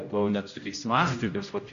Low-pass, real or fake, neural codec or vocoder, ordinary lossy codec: 7.2 kHz; fake; codec, 16 kHz, 0.5 kbps, X-Codec, HuBERT features, trained on general audio; AAC, 48 kbps